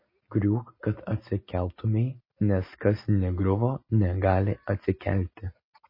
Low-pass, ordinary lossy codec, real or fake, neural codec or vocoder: 5.4 kHz; MP3, 24 kbps; real; none